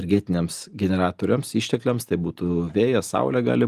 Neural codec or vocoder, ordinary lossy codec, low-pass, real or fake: none; Opus, 32 kbps; 14.4 kHz; real